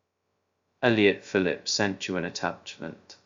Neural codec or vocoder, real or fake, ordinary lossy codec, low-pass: codec, 16 kHz, 0.2 kbps, FocalCodec; fake; Opus, 64 kbps; 7.2 kHz